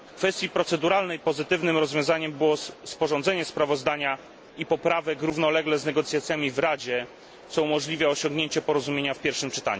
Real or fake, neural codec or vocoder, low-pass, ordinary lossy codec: real; none; none; none